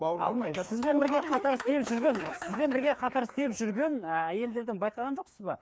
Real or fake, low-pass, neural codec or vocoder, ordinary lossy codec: fake; none; codec, 16 kHz, 2 kbps, FreqCodec, larger model; none